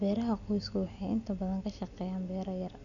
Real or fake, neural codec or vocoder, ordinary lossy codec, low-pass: real; none; none; 7.2 kHz